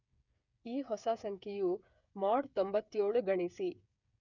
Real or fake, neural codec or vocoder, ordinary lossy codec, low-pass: fake; codec, 16 kHz, 8 kbps, FreqCodec, smaller model; none; 7.2 kHz